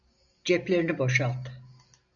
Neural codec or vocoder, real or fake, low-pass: none; real; 7.2 kHz